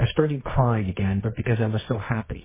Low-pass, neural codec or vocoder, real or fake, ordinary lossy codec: 3.6 kHz; codec, 24 kHz, 0.9 kbps, WavTokenizer, medium music audio release; fake; MP3, 16 kbps